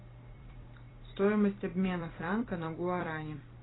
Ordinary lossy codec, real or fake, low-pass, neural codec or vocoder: AAC, 16 kbps; fake; 7.2 kHz; vocoder, 44.1 kHz, 128 mel bands every 256 samples, BigVGAN v2